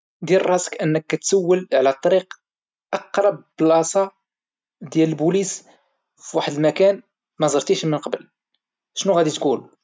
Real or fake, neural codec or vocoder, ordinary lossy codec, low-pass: real; none; none; none